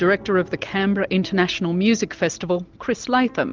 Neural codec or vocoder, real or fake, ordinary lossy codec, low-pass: none; real; Opus, 24 kbps; 7.2 kHz